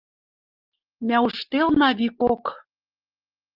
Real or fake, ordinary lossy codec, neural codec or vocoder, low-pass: real; Opus, 32 kbps; none; 5.4 kHz